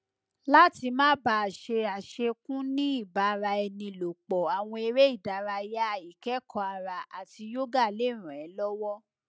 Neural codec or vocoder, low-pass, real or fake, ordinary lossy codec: none; none; real; none